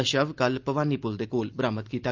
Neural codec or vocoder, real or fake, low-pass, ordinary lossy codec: none; real; 7.2 kHz; Opus, 24 kbps